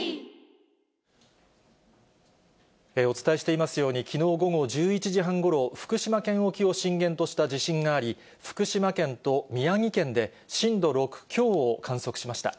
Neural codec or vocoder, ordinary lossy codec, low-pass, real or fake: none; none; none; real